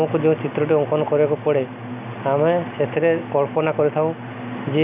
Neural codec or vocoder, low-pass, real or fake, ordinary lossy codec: none; 3.6 kHz; real; none